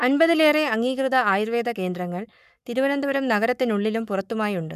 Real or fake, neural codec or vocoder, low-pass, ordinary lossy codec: fake; codec, 44.1 kHz, 7.8 kbps, Pupu-Codec; 14.4 kHz; none